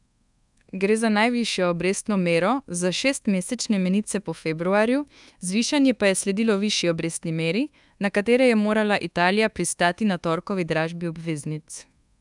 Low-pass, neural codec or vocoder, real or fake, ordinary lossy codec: 10.8 kHz; codec, 24 kHz, 1.2 kbps, DualCodec; fake; none